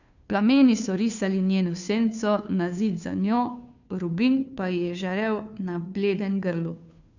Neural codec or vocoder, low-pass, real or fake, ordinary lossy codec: codec, 16 kHz, 2 kbps, FunCodec, trained on Chinese and English, 25 frames a second; 7.2 kHz; fake; none